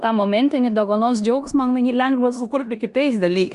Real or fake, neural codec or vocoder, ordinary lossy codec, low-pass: fake; codec, 16 kHz in and 24 kHz out, 0.9 kbps, LongCat-Audio-Codec, four codebook decoder; Opus, 64 kbps; 10.8 kHz